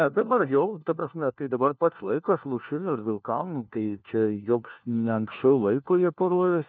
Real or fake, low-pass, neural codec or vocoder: fake; 7.2 kHz; codec, 16 kHz, 1 kbps, FunCodec, trained on LibriTTS, 50 frames a second